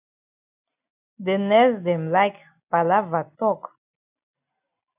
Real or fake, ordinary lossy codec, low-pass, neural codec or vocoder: real; AAC, 32 kbps; 3.6 kHz; none